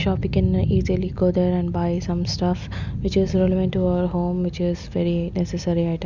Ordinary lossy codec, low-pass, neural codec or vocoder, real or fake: none; 7.2 kHz; none; real